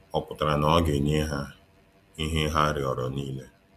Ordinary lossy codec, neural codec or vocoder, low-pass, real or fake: none; none; 14.4 kHz; real